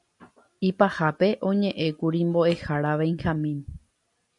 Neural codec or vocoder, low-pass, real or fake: none; 10.8 kHz; real